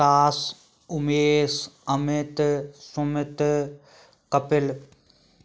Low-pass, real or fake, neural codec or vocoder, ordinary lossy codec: none; real; none; none